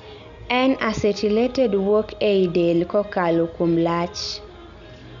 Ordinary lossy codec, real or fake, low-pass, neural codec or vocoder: none; real; 7.2 kHz; none